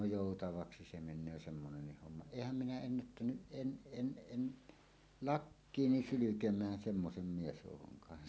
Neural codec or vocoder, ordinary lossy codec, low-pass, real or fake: none; none; none; real